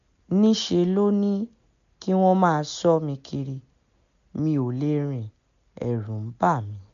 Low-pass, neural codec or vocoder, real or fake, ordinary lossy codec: 7.2 kHz; none; real; MP3, 64 kbps